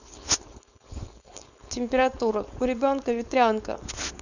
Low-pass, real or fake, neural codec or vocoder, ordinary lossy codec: 7.2 kHz; fake; codec, 16 kHz, 4.8 kbps, FACodec; none